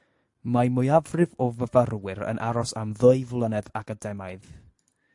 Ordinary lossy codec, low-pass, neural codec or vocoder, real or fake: AAC, 64 kbps; 10.8 kHz; none; real